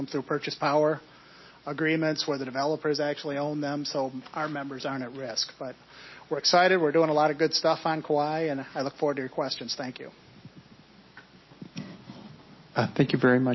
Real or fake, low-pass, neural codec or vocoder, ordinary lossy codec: real; 7.2 kHz; none; MP3, 24 kbps